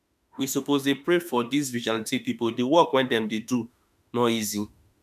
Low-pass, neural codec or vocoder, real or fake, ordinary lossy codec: 14.4 kHz; autoencoder, 48 kHz, 32 numbers a frame, DAC-VAE, trained on Japanese speech; fake; none